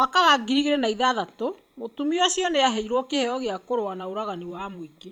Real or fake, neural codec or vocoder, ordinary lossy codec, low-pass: fake; vocoder, 44.1 kHz, 128 mel bands every 512 samples, BigVGAN v2; none; 19.8 kHz